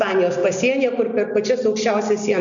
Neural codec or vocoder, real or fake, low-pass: none; real; 7.2 kHz